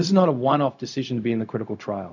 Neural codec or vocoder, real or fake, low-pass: codec, 16 kHz, 0.4 kbps, LongCat-Audio-Codec; fake; 7.2 kHz